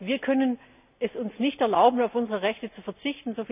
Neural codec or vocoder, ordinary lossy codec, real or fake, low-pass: none; none; real; 3.6 kHz